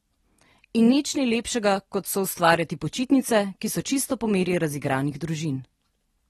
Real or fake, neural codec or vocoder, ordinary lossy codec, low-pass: real; none; AAC, 32 kbps; 19.8 kHz